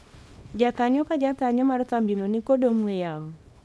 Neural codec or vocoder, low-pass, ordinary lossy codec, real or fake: codec, 24 kHz, 0.9 kbps, WavTokenizer, small release; none; none; fake